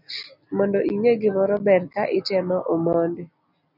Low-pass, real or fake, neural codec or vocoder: 5.4 kHz; real; none